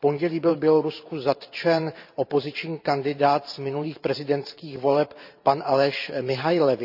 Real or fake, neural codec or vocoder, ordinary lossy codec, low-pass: fake; vocoder, 44.1 kHz, 128 mel bands every 512 samples, BigVGAN v2; none; 5.4 kHz